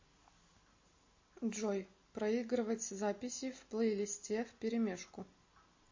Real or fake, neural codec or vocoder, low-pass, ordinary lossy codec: real; none; 7.2 kHz; MP3, 32 kbps